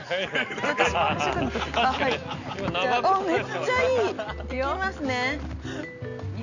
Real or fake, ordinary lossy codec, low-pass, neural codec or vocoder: real; none; 7.2 kHz; none